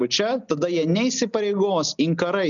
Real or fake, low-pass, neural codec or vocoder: real; 7.2 kHz; none